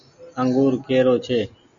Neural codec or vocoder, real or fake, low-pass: none; real; 7.2 kHz